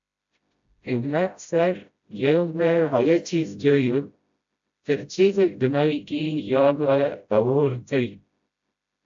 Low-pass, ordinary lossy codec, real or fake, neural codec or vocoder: 7.2 kHz; AAC, 64 kbps; fake; codec, 16 kHz, 0.5 kbps, FreqCodec, smaller model